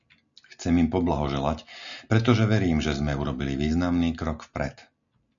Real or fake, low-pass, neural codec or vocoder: real; 7.2 kHz; none